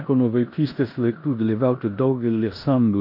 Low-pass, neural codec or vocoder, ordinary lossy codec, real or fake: 5.4 kHz; codec, 16 kHz in and 24 kHz out, 0.9 kbps, LongCat-Audio-Codec, four codebook decoder; MP3, 32 kbps; fake